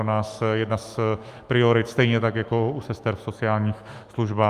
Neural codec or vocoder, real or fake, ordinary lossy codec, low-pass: autoencoder, 48 kHz, 128 numbers a frame, DAC-VAE, trained on Japanese speech; fake; Opus, 32 kbps; 14.4 kHz